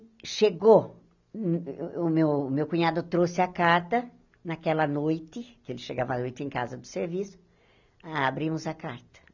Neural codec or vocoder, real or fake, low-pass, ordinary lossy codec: none; real; 7.2 kHz; none